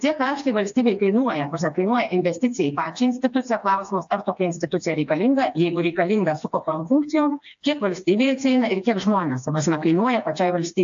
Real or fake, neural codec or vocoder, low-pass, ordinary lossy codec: fake; codec, 16 kHz, 2 kbps, FreqCodec, smaller model; 7.2 kHz; AAC, 64 kbps